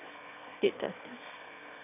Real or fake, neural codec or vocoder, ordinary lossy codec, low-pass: fake; autoencoder, 22.05 kHz, a latent of 192 numbers a frame, VITS, trained on one speaker; none; 3.6 kHz